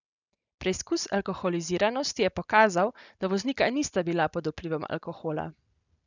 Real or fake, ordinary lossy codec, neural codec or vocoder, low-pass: real; none; none; 7.2 kHz